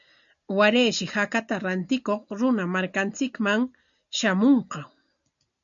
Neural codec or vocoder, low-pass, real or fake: none; 7.2 kHz; real